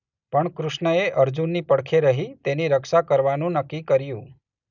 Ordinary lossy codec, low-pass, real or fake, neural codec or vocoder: none; 7.2 kHz; real; none